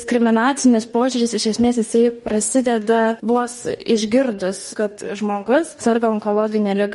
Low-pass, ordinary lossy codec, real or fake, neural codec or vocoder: 19.8 kHz; MP3, 48 kbps; fake; codec, 44.1 kHz, 2.6 kbps, DAC